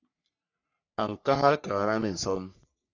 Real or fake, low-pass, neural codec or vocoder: fake; 7.2 kHz; codec, 44.1 kHz, 3.4 kbps, Pupu-Codec